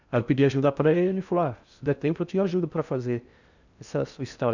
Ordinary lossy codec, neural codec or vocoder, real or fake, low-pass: none; codec, 16 kHz in and 24 kHz out, 0.6 kbps, FocalCodec, streaming, 2048 codes; fake; 7.2 kHz